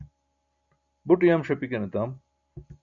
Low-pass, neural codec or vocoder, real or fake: 7.2 kHz; none; real